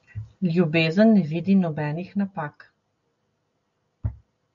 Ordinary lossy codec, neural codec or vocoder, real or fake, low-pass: MP3, 64 kbps; none; real; 7.2 kHz